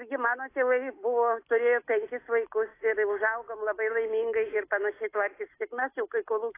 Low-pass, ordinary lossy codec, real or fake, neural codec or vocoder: 3.6 kHz; AAC, 24 kbps; real; none